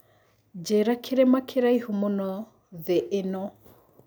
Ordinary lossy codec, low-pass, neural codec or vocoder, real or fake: none; none; none; real